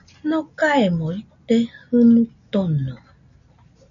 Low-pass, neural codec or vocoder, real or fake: 7.2 kHz; none; real